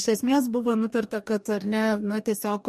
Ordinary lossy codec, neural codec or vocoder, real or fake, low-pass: MP3, 64 kbps; codec, 44.1 kHz, 2.6 kbps, DAC; fake; 14.4 kHz